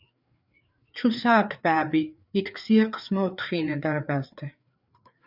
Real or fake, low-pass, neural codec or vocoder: fake; 5.4 kHz; codec, 16 kHz, 4 kbps, FreqCodec, larger model